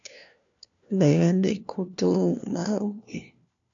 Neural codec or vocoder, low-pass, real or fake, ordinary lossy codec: codec, 16 kHz, 1 kbps, FunCodec, trained on LibriTTS, 50 frames a second; 7.2 kHz; fake; AAC, 64 kbps